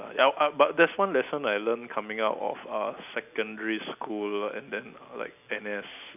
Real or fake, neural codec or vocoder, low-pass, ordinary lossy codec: real; none; 3.6 kHz; none